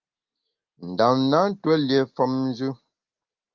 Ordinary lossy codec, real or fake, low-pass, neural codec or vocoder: Opus, 32 kbps; real; 7.2 kHz; none